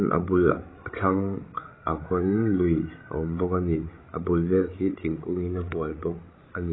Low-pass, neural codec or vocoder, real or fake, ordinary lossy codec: 7.2 kHz; codec, 16 kHz, 16 kbps, FreqCodec, larger model; fake; AAC, 16 kbps